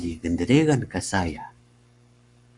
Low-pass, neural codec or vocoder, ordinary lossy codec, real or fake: 10.8 kHz; codec, 44.1 kHz, 7.8 kbps, Pupu-Codec; MP3, 96 kbps; fake